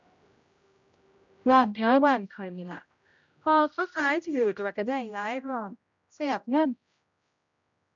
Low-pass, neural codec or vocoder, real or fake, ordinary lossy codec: 7.2 kHz; codec, 16 kHz, 0.5 kbps, X-Codec, HuBERT features, trained on general audio; fake; MP3, 64 kbps